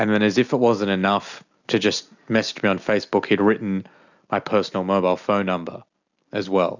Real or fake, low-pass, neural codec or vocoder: real; 7.2 kHz; none